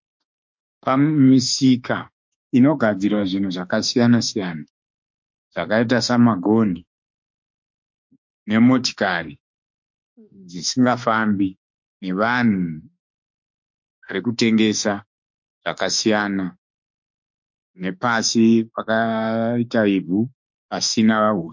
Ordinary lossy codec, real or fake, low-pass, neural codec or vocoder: MP3, 48 kbps; fake; 7.2 kHz; autoencoder, 48 kHz, 32 numbers a frame, DAC-VAE, trained on Japanese speech